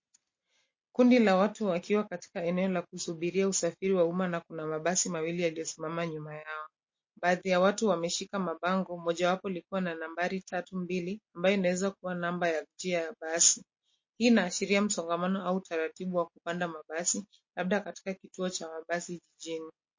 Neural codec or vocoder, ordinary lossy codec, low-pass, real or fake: none; MP3, 32 kbps; 7.2 kHz; real